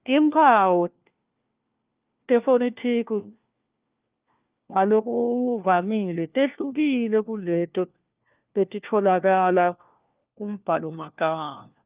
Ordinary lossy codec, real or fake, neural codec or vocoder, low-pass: Opus, 24 kbps; fake; codec, 16 kHz, 1 kbps, FunCodec, trained on LibriTTS, 50 frames a second; 3.6 kHz